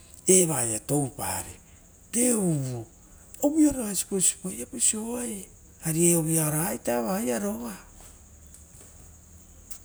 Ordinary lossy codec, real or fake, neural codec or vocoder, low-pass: none; real; none; none